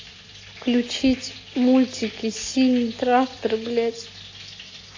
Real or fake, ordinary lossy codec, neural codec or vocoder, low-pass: real; AAC, 32 kbps; none; 7.2 kHz